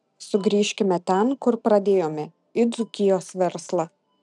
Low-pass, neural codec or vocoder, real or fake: 10.8 kHz; none; real